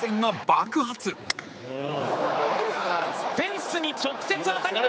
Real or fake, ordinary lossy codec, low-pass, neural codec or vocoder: fake; none; none; codec, 16 kHz, 4 kbps, X-Codec, HuBERT features, trained on general audio